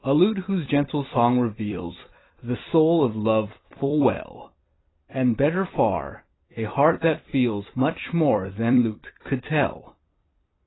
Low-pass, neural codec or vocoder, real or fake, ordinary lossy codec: 7.2 kHz; vocoder, 44.1 kHz, 128 mel bands every 256 samples, BigVGAN v2; fake; AAC, 16 kbps